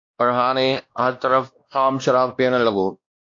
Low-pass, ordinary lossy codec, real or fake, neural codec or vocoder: 7.2 kHz; AAC, 48 kbps; fake; codec, 16 kHz, 1 kbps, X-Codec, WavLM features, trained on Multilingual LibriSpeech